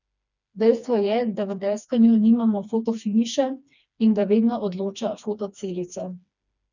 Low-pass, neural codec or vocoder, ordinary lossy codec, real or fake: 7.2 kHz; codec, 16 kHz, 2 kbps, FreqCodec, smaller model; none; fake